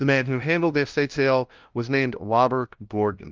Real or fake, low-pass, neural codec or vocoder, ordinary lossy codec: fake; 7.2 kHz; codec, 16 kHz, 0.5 kbps, FunCodec, trained on LibriTTS, 25 frames a second; Opus, 32 kbps